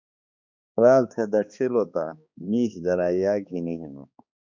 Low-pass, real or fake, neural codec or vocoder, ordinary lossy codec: 7.2 kHz; fake; codec, 16 kHz, 2 kbps, X-Codec, HuBERT features, trained on balanced general audio; MP3, 64 kbps